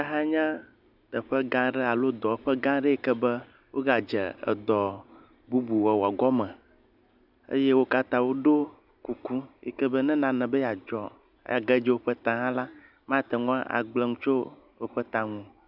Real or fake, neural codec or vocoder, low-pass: real; none; 5.4 kHz